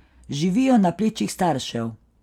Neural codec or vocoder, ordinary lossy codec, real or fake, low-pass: none; none; real; 19.8 kHz